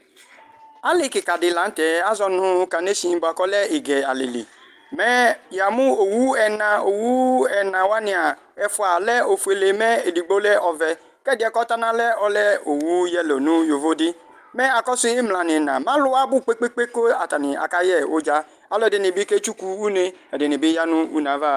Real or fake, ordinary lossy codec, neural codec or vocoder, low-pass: real; Opus, 32 kbps; none; 14.4 kHz